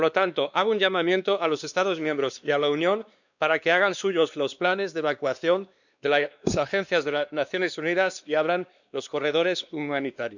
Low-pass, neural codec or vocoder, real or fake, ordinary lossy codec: 7.2 kHz; codec, 16 kHz, 2 kbps, X-Codec, WavLM features, trained on Multilingual LibriSpeech; fake; none